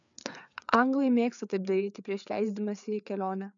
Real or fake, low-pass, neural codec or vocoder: fake; 7.2 kHz; codec, 16 kHz, 4 kbps, FreqCodec, larger model